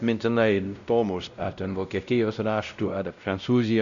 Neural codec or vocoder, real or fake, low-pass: codec, 16 kHz, 0.5 kbps, X-Codec, WavLM features, trained on Multilingual LibriSpeech; fake; 7.2 kHz